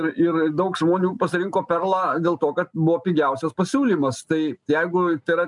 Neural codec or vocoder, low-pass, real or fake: none; 10.8 kHz; real